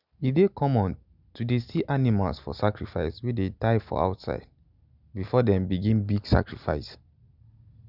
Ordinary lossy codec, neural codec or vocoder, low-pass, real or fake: none; none; 5.4 kHz; real